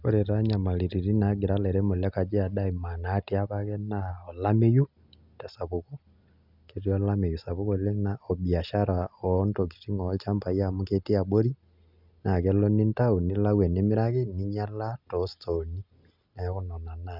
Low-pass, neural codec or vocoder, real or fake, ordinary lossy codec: 5.4 kHz; none; real; none